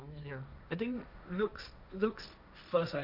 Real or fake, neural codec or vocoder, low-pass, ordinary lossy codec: fake; codec, 16 kHz, 1.1 kbps, Voila-Tokenizer; 5.4 kHz; none